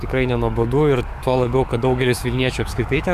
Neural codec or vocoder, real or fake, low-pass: codec, 44.1 kHz, 7.8 kbps, DAC; fake; 14.4 kHz